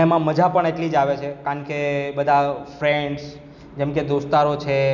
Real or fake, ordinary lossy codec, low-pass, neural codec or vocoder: real; none; 7.2 kHz; none